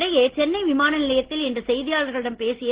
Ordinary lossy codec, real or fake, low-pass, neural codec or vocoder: Opus, 16 kbps; real; 3.6 kHz; none